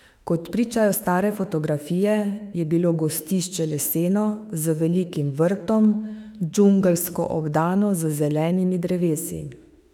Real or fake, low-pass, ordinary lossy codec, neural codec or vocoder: fake; 19.8 kHz; none; autoencoder, 48 kHz, 32 numbers a frame, DAC-VAE, trained on Japanese speech